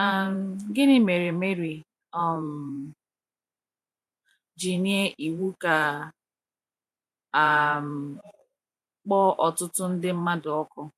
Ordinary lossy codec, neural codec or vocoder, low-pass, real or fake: MP3, 64 kbps; vocoder, 44.1 kHz, 128 mel bands every 512 samples, BigVGAN v2; 14.4 kHz; fake